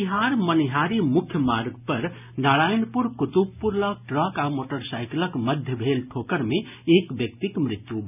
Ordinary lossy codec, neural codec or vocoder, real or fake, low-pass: none; none; real; 3.6 kHz